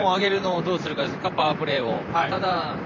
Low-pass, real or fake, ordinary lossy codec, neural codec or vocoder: 7.2 kHz; fake; MP3, 64 kbps; vocoder, 44.1 kHz, 128 mel bands, Pupu-Vocoder